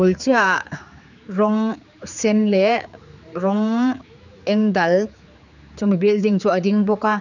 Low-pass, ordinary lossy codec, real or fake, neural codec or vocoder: 7.2 kHz; none; fake; codec, 16 kHz, 4 kbps, X-Codec, HuBERT features, trained on general audio